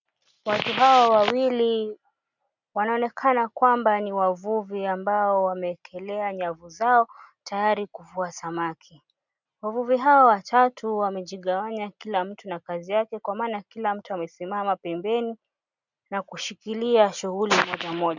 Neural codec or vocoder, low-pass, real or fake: none; 7.2 kHz; real